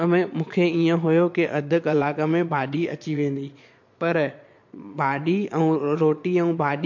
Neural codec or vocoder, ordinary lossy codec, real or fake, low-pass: vocoder, 22.05 kHz, 80 mel bands, Vocos; MP3, 48 kbps; fake; 7.2 kHz